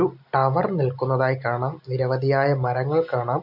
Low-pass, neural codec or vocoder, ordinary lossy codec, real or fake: 5.4 kHz; none; none; real